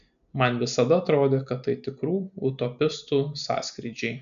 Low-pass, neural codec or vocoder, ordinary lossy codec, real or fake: 7.2 kHz; none; AAC, 96 kbps; real